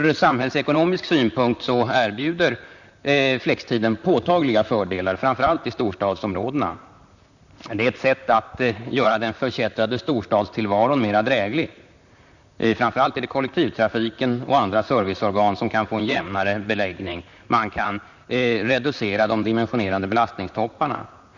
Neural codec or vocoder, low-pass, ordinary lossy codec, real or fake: vocoder, 44.1 kHz, 128 mel bands, Pupu-Vocoder; 7.2 kHz; none; fake